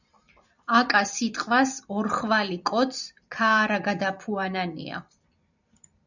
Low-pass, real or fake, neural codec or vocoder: 7.2 kHz; fake; vocoder, 44.1 kHz, 128 mel bands every 256 samples, BigVGAN v2